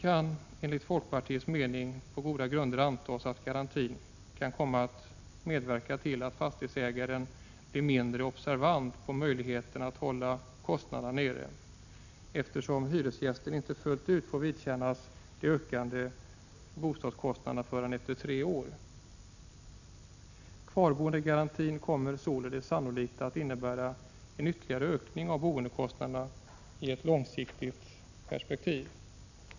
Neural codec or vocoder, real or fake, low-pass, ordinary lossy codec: none; real; 7.2 kHz; none